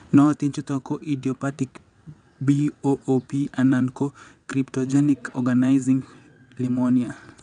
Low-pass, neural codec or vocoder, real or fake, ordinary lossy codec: 9.9 kHz; vocoder, 22.05 kHz, 80 mel bands, WaveNeXt; fake; none